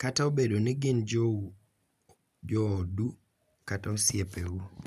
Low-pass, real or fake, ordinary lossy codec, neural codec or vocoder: 14.4 kHz; real; none; none